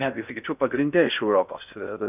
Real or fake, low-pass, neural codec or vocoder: fake; 3.6 kHz; codec, 16 kHz in and 24 kHz out, 0.6 kbps, FocalCodec, streaming, 4096 codes